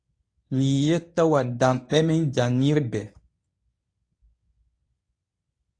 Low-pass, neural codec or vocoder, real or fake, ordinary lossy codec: 9.9 kHz; codec, 24 kHz, 0.9 kbps, WavTokenizer, medium speech release version 1; fake; AAC, 48 kbps